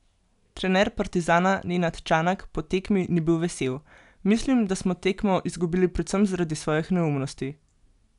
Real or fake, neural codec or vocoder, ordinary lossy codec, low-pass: real; none; none; 10.8 kHz